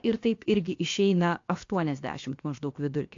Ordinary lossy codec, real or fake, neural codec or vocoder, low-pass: AAC, 48 kbps; fake; codec, 16 kHz, about 1 kbps, DyCAST, with the encoder's durations; 7.2 kHz